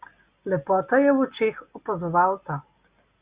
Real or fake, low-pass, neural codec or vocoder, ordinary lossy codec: real; 3.6 kHz; none; Opus, 64 kbps